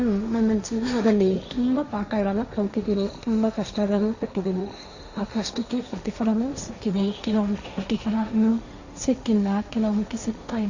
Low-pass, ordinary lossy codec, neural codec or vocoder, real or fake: 7.2 kHz; Opus, 64 kbps; codec, 16 kHz, 1.1 kbps, Voila-Tokenizer; fake